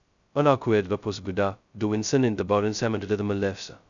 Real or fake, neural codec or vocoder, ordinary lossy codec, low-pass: fake; codec, 16 kHz, 0.2 kbps, FocalCodec; none; 7.2 kHz